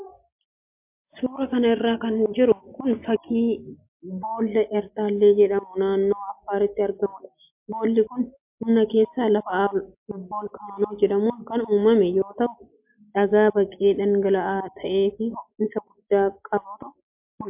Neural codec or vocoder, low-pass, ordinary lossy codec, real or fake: none; 3.6 kHz; MP3, 32 kbps; real